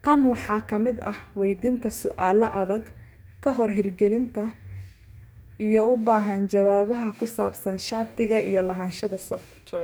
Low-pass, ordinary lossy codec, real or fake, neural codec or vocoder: none; none; fake; codec, 44.1 kHz, 2.6 kbps, DAC